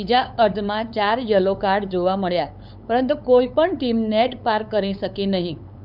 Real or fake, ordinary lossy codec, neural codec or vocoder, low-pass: fake; none; codec, 16 kHz, 8 kbps, FunCodec, trained on LibriTTS, 25 frames a second; 5.4 kHz